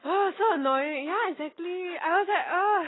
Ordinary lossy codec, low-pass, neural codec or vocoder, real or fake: AAC, 16 kbps; 7.2 kHz; none; real